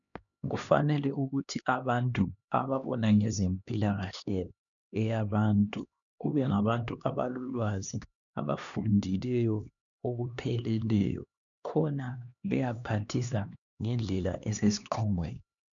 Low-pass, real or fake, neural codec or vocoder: 7.2 kHz; fake; codec, 16 kHz, 2 kbps, X-Codec, HuBERT features, trained on LibriSpeech